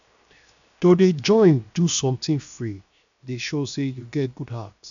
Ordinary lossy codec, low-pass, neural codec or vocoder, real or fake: none; 7.2 kHz; codec, 16 kHz, 0.7 kbps, FocalCodec; fake